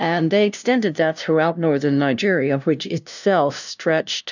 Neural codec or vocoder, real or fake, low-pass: codec, 16 kHz, 0.5 kbps, FunCodec, trained on LibriTTS, 25 frames a second; fake; 7.2 kHz